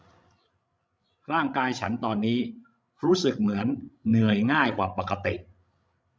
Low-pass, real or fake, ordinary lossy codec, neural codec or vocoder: none; fake; none; codec, 16 kHz, 16 kbps, FreqCodec, larger model